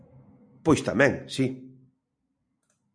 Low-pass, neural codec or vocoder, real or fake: 9.9 kHz; none; real